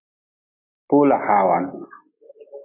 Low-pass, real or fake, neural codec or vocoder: 3.6 kHz; real; none